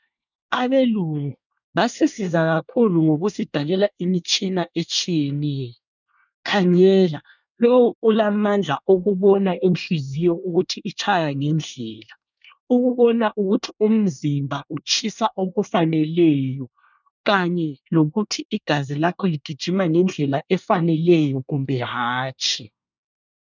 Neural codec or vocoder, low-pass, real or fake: codec, 24 kHz, 1 kbps, SNAC; 7.2 kHz; fake